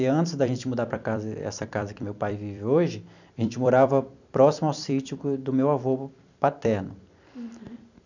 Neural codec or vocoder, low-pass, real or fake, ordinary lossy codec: vocoder, 44.1 kHz, 128 mel bands every 256 samples, BigVGAN v2; 7.2 kHz; fake; none